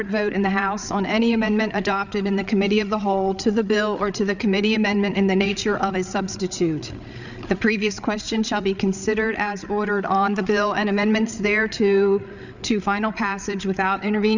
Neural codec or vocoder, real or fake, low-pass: codec, 16 kHz, 8 kbps, FreqCodec, larger model; fake; 7.2 kHz